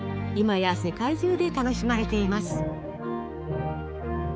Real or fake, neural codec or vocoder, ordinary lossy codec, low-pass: fake; codec, 16 kHz, 4 kbps, X-Codec, HuBERT features, trained on balanced general audio; none; none